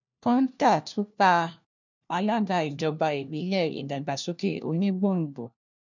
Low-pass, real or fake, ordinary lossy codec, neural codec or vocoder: 7.2 kHz; fake; none; codec, 16 kHz, 1 kbps, FunCodec, trained on LibriTTS, 50 frames a second